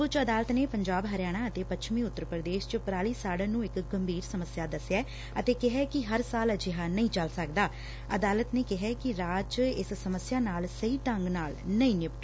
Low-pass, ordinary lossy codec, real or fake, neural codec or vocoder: none; none; real; none